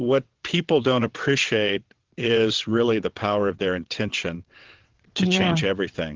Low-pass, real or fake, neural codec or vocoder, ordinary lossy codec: 7.2 kHz; fake; vocoder, 22.05 kHz, 80 mel bands, WaveNeXt; Opus, 16 kbps